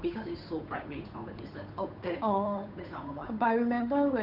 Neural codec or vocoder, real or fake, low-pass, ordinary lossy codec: codec, 16 kHz, 8 kbps, FunCodec, trained on Chinese and English, 25 frames a second; fake; 5.4 kHz; none